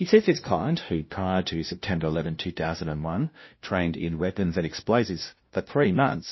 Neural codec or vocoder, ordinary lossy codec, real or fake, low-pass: codec, 16 kHz, 0.5 kbps, FunCodec, trained on LibriTTS, 25 frames a second; MP3, 24 kbps; fake; 7.2 kHz